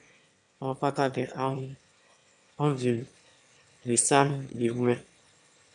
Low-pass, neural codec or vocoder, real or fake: 9.9 kHz; autoencoder, 22.05 kHz, a latent of 192 numbers a frame, VITS, trained on one speaker; fake